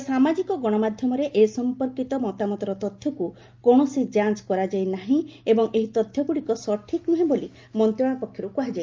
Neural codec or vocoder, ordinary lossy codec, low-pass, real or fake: none; Opus, 24 kbps; 7.2 kHz; real